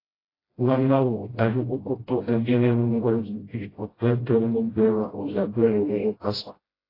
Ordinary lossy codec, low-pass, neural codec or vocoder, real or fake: AAC, 24 kbps; 5.4 kHz; codec, 16 kHz, 0.5 kbps, FreqCodec, smaller model; fake